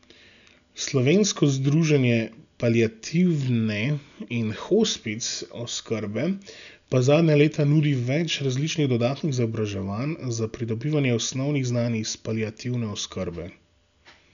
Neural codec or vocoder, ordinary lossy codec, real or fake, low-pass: none; none; real; 7.2 kHz